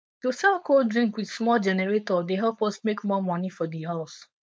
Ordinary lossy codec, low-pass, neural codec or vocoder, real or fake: none; none; codec, 16 kHz, 4.8 kbps, FACodec; fake